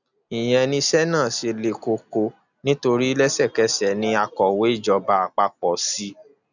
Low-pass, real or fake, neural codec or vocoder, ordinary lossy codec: 7.2 kHz; real; none; none